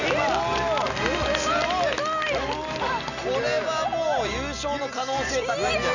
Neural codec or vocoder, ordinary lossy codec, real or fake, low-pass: none; none; real; 7.2 kHz